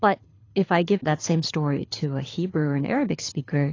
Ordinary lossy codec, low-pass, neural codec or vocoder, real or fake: AAC, 32 kbps; 7.2 kHz; codec, 24 kHz, 6 kbps, HILCodec; fake